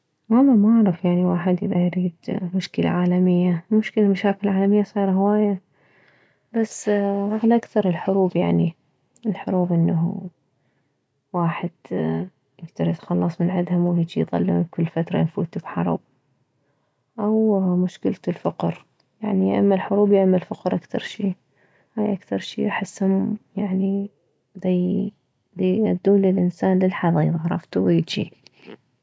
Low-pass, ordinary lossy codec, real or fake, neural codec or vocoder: none; none; real; none